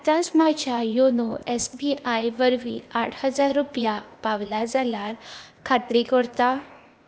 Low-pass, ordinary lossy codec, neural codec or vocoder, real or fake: none; none; codec, 16 kHz, 0.8 kbps, ZipCodec; fake